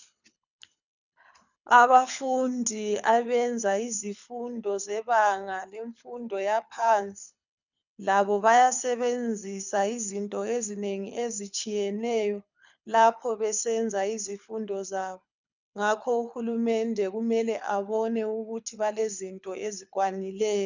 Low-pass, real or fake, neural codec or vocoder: 7.2 kHz; fake; codec, 24 kHz, 6 kbps, HILCodec